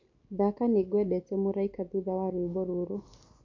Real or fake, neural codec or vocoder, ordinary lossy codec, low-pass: real; none; MP3, 48 kbps; 7.2 kHz